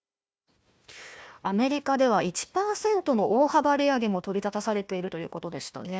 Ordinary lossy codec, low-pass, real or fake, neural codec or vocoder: none; none; fake; codec, 16 kHz, 1 kbps, FunCodec, trained on Chinese and English, 50 frames a second